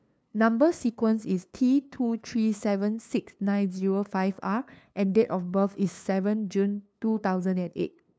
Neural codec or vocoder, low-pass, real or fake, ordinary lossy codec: codec, 16 kHz, 2 kbps, FunCodec, trained on LibriTTS, 25 frames a second; none; fake; none